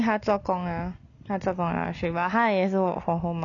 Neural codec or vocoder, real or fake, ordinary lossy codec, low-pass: none; real; none; 7.2 kHz